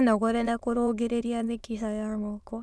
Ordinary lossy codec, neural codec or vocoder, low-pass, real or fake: none; autoencoder, 22.05 kHz, a latent of 192 numbers a frame, VITS, trained on many speakers; none; fake